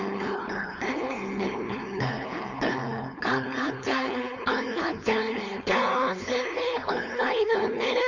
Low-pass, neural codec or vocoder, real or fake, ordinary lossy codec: 7.2 kHz; codec, 16 kHz, 4.8 kbps, FACodec; fake; MP3, 48 kbps